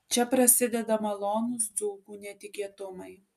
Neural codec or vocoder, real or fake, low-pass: none; real; 14.4 kHz